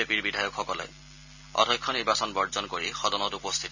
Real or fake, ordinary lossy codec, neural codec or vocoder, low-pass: real; none; none; 7.2 kHz